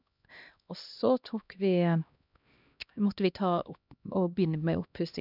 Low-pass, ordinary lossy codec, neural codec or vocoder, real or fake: 5.4 kHz; none; codec, 16 kHz, 1 kbps, X-Codec, HuBERT features, trained on LibriSpeech; fake